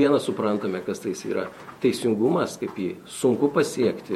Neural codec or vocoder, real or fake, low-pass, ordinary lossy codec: vocoder, 48 kHz, 128 mel bands, Vocos; fake; 19.8 kHz; MP3, 64 kbps